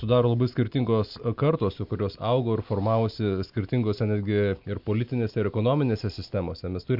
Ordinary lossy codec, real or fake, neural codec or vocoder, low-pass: MP3, 48 kbps; real; none; 5.4 kHz